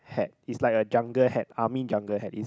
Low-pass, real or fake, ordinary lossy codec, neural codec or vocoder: none; real; none; none